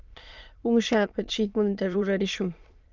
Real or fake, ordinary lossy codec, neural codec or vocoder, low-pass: fake; Opus, 32 kbps; autoencoder, 22.05 kHz, a latent of 192 numbers a frame, VITS, trained on many speakers; 7.2 kHz